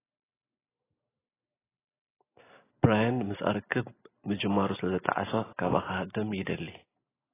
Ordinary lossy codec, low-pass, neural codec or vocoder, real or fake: AAC, 16 kbps; 3.6 kHz; none; real